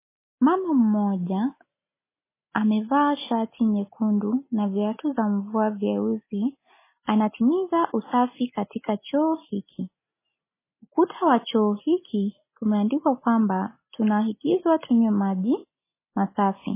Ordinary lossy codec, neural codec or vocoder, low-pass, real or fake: MP3, 16 kbps; none; 3.6 kHz; real